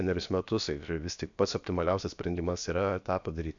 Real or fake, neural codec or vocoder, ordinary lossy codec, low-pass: fake; codec, 16 kHz, about 1 kbps, DyCAST, with the encoder's durations; MP3, 64 kbps; 7.2 kHz